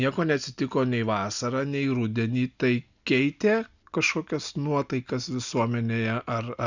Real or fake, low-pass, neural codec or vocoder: real; 7.2 kHz; none